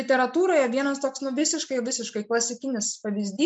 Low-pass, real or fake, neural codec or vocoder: 9.9 kHz; real; none